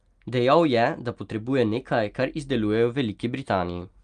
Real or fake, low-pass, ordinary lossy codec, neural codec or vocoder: real; 9.9 kHz; Opus, 32 kbps; none